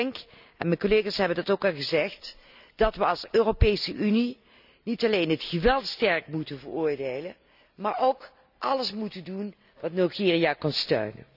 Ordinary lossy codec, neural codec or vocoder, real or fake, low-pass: none; none; real; 5.4 kHz